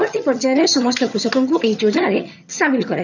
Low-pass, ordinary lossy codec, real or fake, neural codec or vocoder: 7.2 kHz; none; fake; vocoder, 22.05 kHz, 80 mel bands, HiFi-GAN